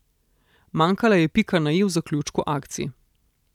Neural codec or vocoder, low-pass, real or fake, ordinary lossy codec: none; 19.8 kHz; real; none